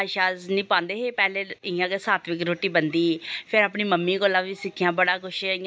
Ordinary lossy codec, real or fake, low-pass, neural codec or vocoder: none; real; none; none